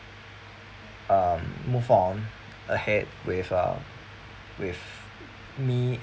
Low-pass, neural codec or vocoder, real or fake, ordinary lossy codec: none; none; real; none